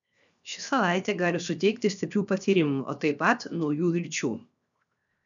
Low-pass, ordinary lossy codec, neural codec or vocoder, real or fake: 7.2 kHz; MP3, 96 kbps; codec, 16 kHz, 0.7 kbps, FocalCodec; fake